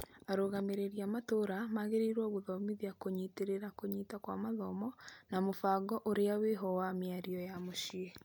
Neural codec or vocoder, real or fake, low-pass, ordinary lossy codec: none; real; none; none